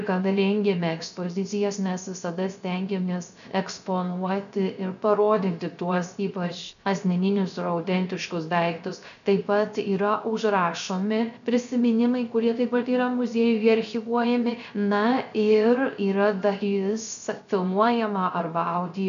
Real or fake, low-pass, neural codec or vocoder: fake; 7.2 kHz; codec, 16 kHz, 0.3 kbps, FocalCodec